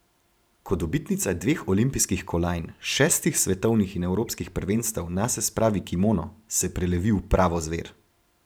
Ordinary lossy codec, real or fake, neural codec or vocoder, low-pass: none; fake; vocoder, 44.1 kHz, 128 mel bands every 512 samples, BigVGAN v2; none